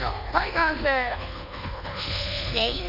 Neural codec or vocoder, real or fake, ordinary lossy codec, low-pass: codec, 24 kHz, 1.2 kbps, DualCodec; fake; none; 5.4 kHz